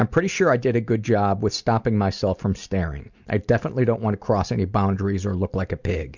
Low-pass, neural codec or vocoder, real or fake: 7.2 kHz; none; real